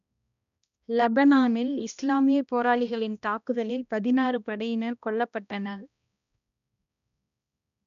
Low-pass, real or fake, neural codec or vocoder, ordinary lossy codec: 7.2 kHz; fake; codec, 16 kHz, 1 kbps, X-Codec, HuBERT features, trained on balanced general audio; none